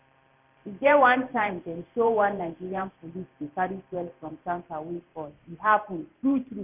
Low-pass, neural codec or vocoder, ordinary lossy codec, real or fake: 3.6 kHz; vocoder, 44.1 kHz, 128 mel bands every 512 samples, BigVGAN v2; none; fake